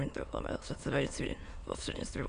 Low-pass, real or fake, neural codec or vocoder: 9.9 kHz; fake; autoencoder, 22.05 kHz, a latent of 192 numbers a frame, VITS, trained on many speakers